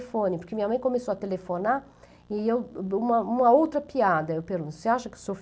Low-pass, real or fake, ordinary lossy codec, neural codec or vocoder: none; real; none; none